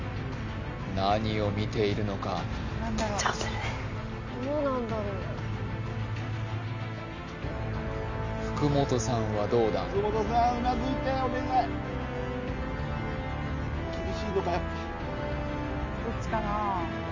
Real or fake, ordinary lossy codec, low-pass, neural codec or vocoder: real; MP3, 64 kbps; 7.2 kHz; none